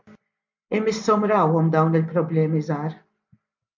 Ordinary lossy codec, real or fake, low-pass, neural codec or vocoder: MP3, 64 kbps; real; 7.2 kHz; none